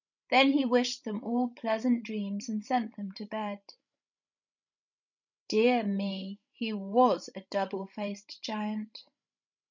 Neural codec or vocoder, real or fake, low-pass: codec, 16 kHz, 16 kbps, FreqCodec, larger model; fake; 7.2 kHz